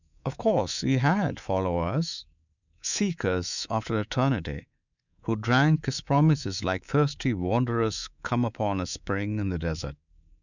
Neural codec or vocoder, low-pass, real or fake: codec, 24 kHz, 3.1 kbps, DualCodec; 7.2 kHz; fake